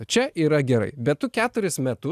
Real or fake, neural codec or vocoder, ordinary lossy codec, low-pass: real; none; AAC, 96 kbps; 14.4 kHz